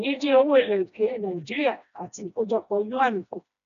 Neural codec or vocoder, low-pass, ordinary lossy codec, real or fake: codec, 16 kHz, 1 kbps, FreqCodec, smaller model; 7.2 kHz; none; fake